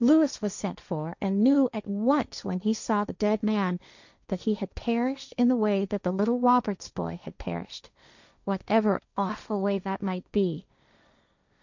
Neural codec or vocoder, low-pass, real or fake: codec, 16 kHz, 1.1 kbps, Voila-Tokenizer; 7.2 kHz; fake